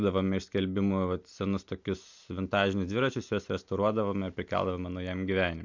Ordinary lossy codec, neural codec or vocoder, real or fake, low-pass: MP3, 64 kbps; none; real; 7.2 kHz